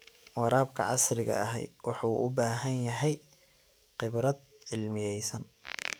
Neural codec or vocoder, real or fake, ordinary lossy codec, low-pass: codec, 44.1 kHz, 7.8 kbps, DAC; fake; none; none